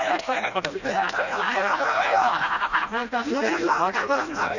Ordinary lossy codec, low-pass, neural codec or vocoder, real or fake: none; 7.2 kHz; codec, 16 kHz, 1 kbps, FreqCodec, smaller model; fake